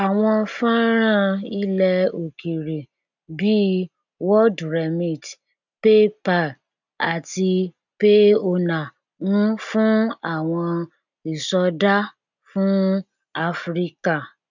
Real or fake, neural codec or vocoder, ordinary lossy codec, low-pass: real; none; none; 7.2 kHz